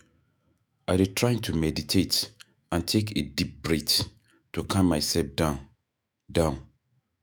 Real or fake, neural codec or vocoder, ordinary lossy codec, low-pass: fake; autoencoder, 48 kHz, 128 numbers a frame, DAC-VAE, trained on Japanese speech; none; none